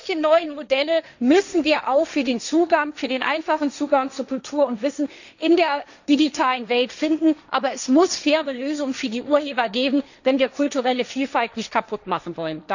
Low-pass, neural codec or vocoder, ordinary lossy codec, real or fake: 7.2 kHz; codec, 16 kHz, 1.1 kbps, Voila-Tokenizer; none; fake